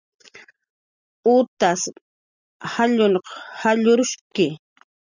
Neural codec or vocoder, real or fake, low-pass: none; real; 7.2 kHz